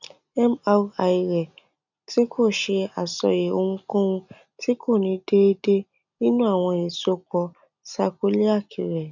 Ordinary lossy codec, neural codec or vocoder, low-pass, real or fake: none; none; 7.2 kHz; real